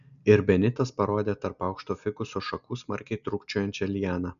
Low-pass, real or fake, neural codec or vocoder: 7.2 kHz; real; none